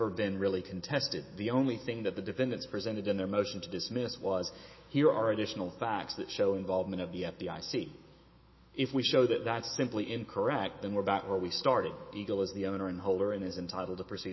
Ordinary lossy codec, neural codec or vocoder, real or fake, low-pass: MP3, 24 kbps; autoencoder, 48 kHz, 128 numbers a frame, DAC-VAE, trained on Japanese speech; fake; 7.2 kHz